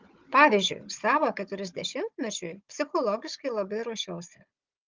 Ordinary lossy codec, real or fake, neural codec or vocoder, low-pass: Opus, 32 kbps; fake; codec, 16 kHz, 16 kbps, FunCodec, trained on Chinese and English, 50 frames a second; 7.2 kHz